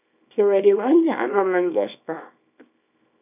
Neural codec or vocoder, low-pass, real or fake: codec, 24 kHz, 0.9 kbps, WavTokenizer, small release; 3.6 kHz; fake